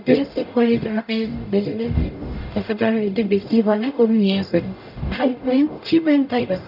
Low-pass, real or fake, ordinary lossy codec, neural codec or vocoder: 5.4 kHz; fake; none; codec, 44.1 kHz, 0.9 kbps, DAC